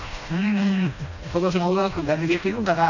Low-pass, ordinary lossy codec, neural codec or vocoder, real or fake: 7.2 kHz; none; codec, 16 kHz, 1 kbps, FreqCodec, smaller model; fake